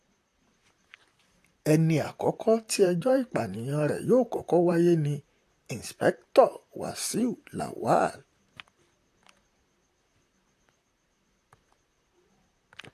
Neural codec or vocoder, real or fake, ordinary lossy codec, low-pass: vocoder, 44.1 kHz, 128 mel bands, Pupu-Vocoder; fake; AAC, 64 kbps; 14.4 kHz